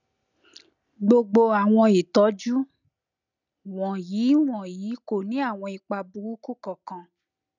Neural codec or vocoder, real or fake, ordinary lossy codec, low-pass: none; real; none; 7.2 kHz